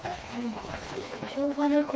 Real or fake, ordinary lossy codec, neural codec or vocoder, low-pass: fake; none; codec, 16 kHz, 2 kbps, FreqCodec, smaller model; none